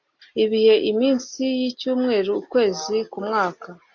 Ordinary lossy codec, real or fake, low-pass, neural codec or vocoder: AAC, 48 kbps; real; 7.2 kHz; none